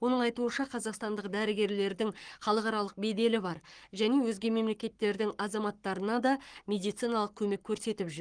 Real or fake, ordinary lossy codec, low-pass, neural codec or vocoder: fake; Opus, 24 kbps; 9.9 kHz; codec, 44.1 kHz, 7.8 kbps, Pupu-Codec